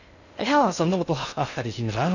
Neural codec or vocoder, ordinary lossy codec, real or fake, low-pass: codec, 16 kHz in and 24 kHz out, 0.6 kbps, FocalCodec, streaming, 2048 codes; none; fake; 7.2 kHz